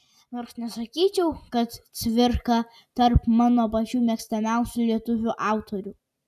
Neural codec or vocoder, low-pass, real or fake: none; 14.4 kHz; real